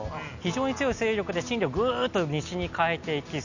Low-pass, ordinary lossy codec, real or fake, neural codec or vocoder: 7.2 kHz; none; real; none